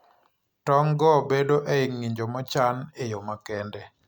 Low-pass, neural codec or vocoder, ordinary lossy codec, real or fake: none; none; none; real